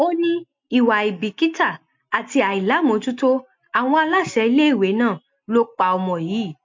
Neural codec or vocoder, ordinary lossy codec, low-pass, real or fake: none; MP3, 48 kbps; 7.2 kHz; real